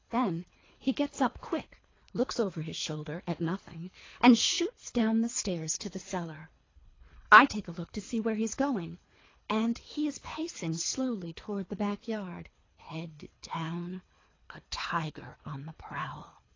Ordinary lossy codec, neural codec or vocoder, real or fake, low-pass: AAC, 32 kbps; codec, 24 kHz, 3 kbps, HILCodec; fake; 7.2 kHz